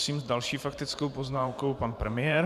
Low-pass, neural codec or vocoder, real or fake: 14.4 kHz; vocoder, 44.1 kHz, 128 mel bands every 512 samples, BigVGAN v2; fake